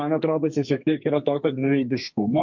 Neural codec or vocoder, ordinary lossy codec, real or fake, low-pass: codec, 32 kHz, 1.9 kbps, SNAC; MP3, 48 kbps; fake; 7.2 kHz